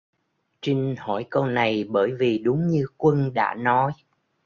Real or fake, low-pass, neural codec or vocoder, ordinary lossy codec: real; 7.2 kHz; none; Opus, 64 kbps